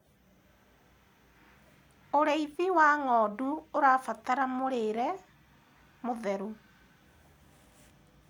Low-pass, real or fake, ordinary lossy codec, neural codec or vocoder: none; real; none; none